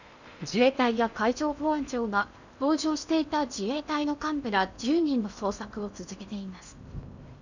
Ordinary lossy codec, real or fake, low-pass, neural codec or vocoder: none; fake; 7.2 kHz; codec, 16 kHz in and 24 kHz out, 0.8 kbps, FocalCodec, streaming, 65536 codes